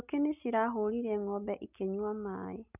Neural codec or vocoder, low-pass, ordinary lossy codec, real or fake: none; 3.6 kHz; none; real